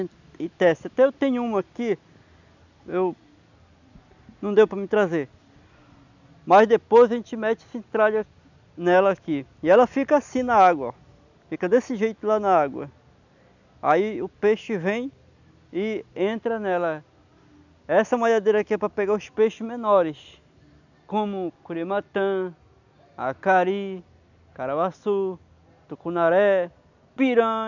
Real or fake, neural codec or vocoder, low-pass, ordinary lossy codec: real; none; 7.2 kHz; none